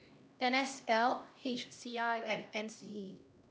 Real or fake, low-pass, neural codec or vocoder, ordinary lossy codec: fake; none; codec, 16 kHz, 1 kbps, X-Codec, HuBERT features, trained on LibriSpeech; none